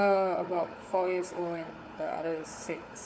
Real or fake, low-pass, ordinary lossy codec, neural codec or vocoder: fake; none; none; codec, 16 kHz, 4 kbps, FunCodec, trained on Chinese and English, 50 frames a second